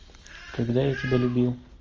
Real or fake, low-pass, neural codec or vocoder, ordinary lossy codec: real; 7.2 kHz; none; Opus, 24 kbps